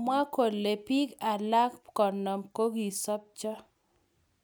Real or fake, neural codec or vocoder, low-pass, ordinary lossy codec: real; none; none; none